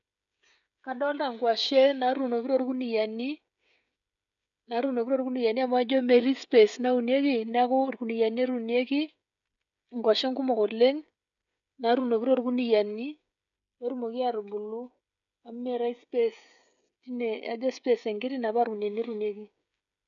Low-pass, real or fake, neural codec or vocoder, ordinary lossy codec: 7.2 kHz; fake; codec, 16 kHz, 16 kbps, FreqCodec, smaller model; none